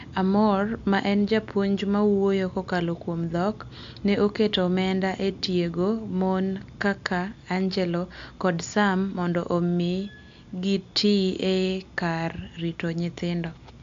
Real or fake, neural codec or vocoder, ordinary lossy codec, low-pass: real; none; AAC, 64 kbps; 7.2 kHz